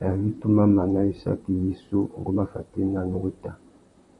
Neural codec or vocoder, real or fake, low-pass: vocoder, 44.1 kHz, 128 mel bands, Pupu-Vocoder; fake; 10.8 kHz